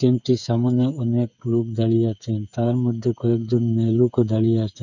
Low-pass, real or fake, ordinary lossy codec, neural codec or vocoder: 7.2 kHz; fake; none; codec, 16 kHz, 8 kbps, FreqCodec, smaller model